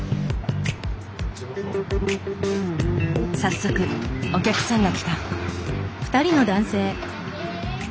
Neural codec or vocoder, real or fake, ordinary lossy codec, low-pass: none; real; none; none